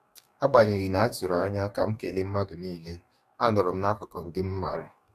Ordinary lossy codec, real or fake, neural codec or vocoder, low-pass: none; fake; codec, 44.1 kHz, 2.6 kbps, DAC; 14.4 kHz